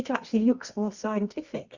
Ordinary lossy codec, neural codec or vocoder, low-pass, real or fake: Opus, 64 kbps; codec, 24 kHz, 0.9 kbps, WavTokenizer, medium music audio release; 7.2 kHz; fake